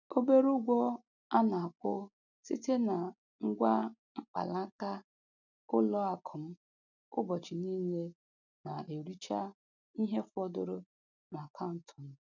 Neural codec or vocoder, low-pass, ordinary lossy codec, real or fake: none; 7.2 kHz; none; real